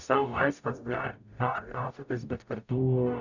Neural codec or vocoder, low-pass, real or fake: codec, 44.1 kHz, 0.9 kbps, DAC; 7.2 kHz; fake